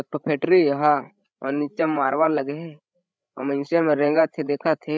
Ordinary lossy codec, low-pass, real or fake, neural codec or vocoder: none; none; fake; codec, 16 kHz, 8 kbps, FreqCodec, larger model